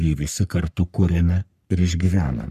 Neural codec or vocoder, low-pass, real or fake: codec, 44.1 kHz, 3.4 kbps, Pupu-Codec; 14.4 kHz; fake